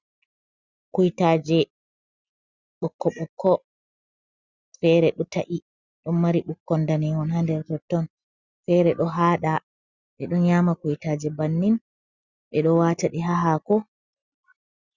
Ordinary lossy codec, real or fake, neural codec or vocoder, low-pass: Opus, 64 kbps; real; none; 7.2 kHz